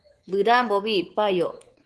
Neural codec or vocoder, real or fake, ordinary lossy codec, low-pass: codec, 24 kHz, 3.1 kbps, DualCodec; fake; Opus, 16 kbps; 10.8 kHz